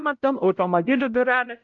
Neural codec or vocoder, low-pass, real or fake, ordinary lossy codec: codec, 16 kHz, 0.5 kbps, X-Codec, WavLM features, trained on Multilingual LibriSpeech; 7.2 kHz; fake; Opus, 32 kbps